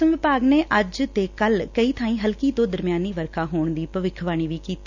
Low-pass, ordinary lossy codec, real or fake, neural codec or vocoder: 7.2 kHz; none; real; none